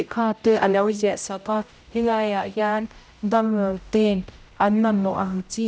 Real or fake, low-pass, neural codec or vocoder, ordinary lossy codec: fake; none; codec, 16 kHz, 0.5 kbps, X-Codec, HuBERT features, trained on general audio; none